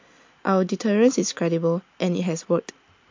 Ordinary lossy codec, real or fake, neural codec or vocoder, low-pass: MP3, 48 kbps; real; none; 7.2 kHz